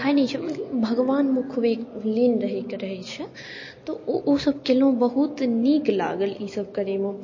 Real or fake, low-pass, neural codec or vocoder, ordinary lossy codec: real; 7.2 kHz; none; MP3, 32 kbps